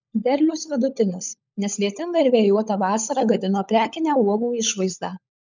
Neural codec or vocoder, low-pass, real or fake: codec, 16 kHz, 16 kbps, FunCodec, trained on LibriTTS, 50 frames a second; 7.2 kHz; fake